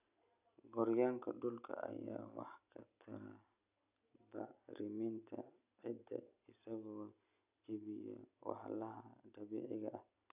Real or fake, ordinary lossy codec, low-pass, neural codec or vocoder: real; none; 3.6 kHz; none